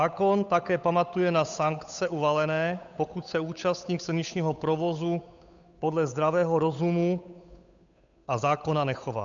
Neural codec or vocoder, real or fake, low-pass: codec, 16 kHz, 8 kbps, FunCodec, trained on Chinese and English, 25 frames a second; fake; 7.2 kHz